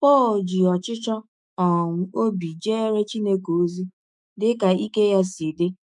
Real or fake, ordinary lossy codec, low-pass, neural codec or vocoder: fake; none; 10.8 kHz; autoencoder, 48 kHz, 128 numbers a frame, DAC-VAE, trained on Japanese speech